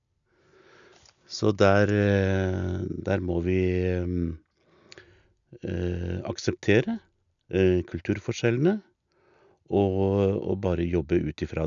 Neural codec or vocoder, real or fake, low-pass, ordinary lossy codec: none; real; 7.2 kHz; none